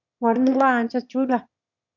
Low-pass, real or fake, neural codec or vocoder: 7.2 kHz; fake; autoencoder, 22.05 kHz, a latent of 192 numbers a frame, VITS, trained on one speaker